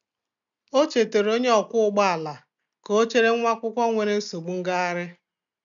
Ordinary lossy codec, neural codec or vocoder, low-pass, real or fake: none; none; 7.2 kHz; real